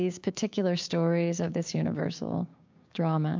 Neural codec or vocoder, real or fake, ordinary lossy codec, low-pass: codec, 16 kHz, 6 kbps, DAC; fake; MP3, 64 kbps; 7.2 kHz